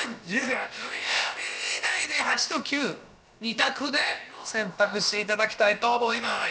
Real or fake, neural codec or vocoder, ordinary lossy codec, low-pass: fake; codec, 16 kHz, about 1 kbps, DyCAST, with the encoder's durations; none; none